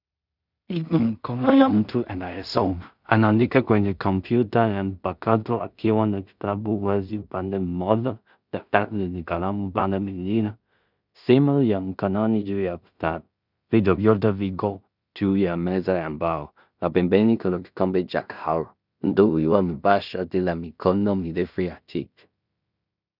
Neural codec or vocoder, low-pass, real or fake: codec, 16 kHz in and 24 kHz out, 0.4 kbps, LongCat-Audio-Codec, two codebook decoder; 5.4 kHz; fake